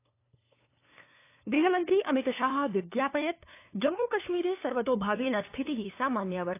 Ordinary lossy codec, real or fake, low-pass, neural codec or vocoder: AAC, 24 kbps; fake; 3.6 kHz; codec, 16 kHz, 2 kbps, FunCodec, trained on LibriTTS, 25 frames a second